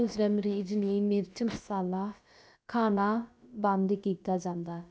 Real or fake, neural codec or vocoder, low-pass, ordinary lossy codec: fake; codec, 16 kHz, about 1 kbps, DyCAST, with the encoder's durations; none; none